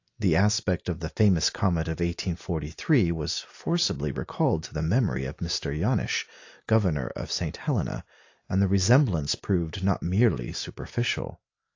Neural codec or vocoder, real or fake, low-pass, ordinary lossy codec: none; real; 7.2 kHz; AAC, 48 kbps